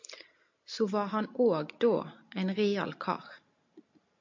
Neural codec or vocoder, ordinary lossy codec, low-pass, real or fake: none; MP3, 48 kbps; 7.2 kHz; real